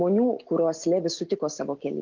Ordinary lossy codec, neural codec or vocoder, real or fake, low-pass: Opus, 32 kbps; none; real; 7.2 kHz